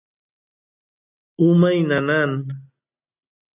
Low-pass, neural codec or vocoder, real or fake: 3.6 kHz; none; real